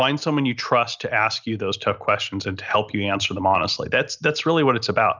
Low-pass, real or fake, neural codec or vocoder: 7.2 kHz; real; none